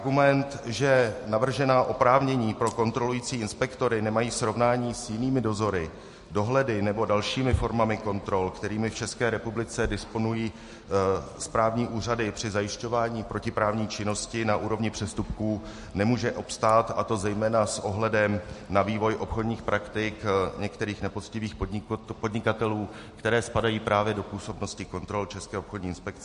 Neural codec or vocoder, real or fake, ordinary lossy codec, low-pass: none; real; MP3, 48 kbps; 14.4 kHz